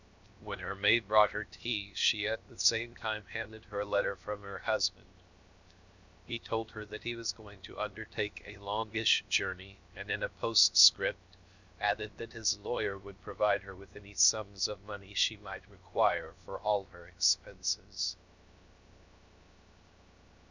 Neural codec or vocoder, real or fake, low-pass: codec, 16 kHz, 0.7 kbps, FocalCodec; fake; 7.2 kHz